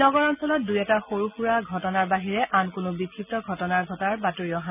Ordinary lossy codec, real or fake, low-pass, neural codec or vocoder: none; real; 3.6 kHz; none